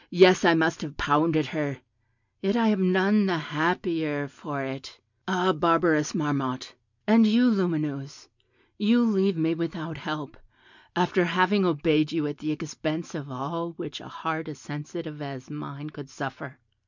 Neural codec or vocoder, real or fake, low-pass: none; real; 7.2 kHz